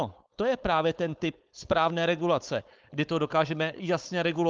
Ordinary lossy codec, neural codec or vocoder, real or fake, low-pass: Opus, 24 kbps; codec, 16 kHz, 4.8 kbps, FACodec; fake; 7.2 kHz